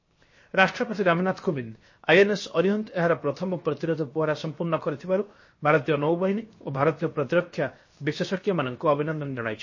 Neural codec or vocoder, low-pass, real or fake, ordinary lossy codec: codec, 16 kHz, 0.7 kbps, FocalCodec; 7.2 kHz; fake; MP3, 32 kbps